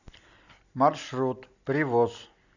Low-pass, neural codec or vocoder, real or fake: 7.2 kHz; none; real